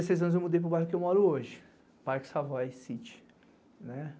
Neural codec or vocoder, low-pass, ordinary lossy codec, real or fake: none; none; none; real